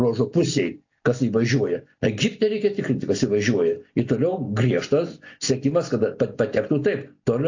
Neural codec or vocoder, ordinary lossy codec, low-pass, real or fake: none; AAC, 48 kbps; 7.2 kHz; real